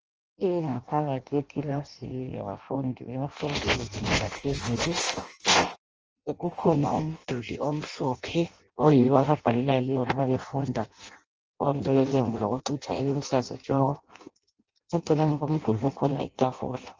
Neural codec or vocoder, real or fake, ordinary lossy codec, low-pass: codec, 16 kHz in and 24 kHz out, 0.6 kbps, FireRedTTS-2 codec; fake; Opus, 24 kbps; 7.2 kHz